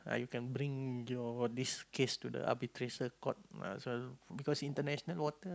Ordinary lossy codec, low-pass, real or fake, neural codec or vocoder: none; none; real; none